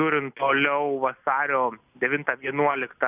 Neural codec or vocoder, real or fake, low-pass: none; real; 3.6 kHz